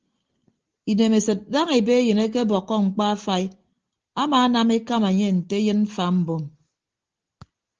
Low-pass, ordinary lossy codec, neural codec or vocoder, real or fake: 7.2 kHz; Opus, 16 kbps; none; real